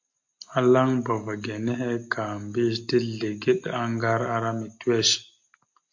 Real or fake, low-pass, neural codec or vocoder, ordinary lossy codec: real; 7.2 kHz; none; MP3, 48 kbps